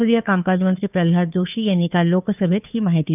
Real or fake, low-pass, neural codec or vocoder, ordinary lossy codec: fake; 3.6 kHz; codec, 16 kHz, 2 kbps, FunCodec, trained on Chinese and English, 25 frames a second; none